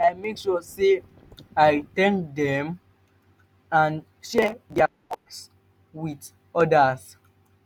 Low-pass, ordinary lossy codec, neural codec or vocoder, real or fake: 19.8 kHz; none; none; real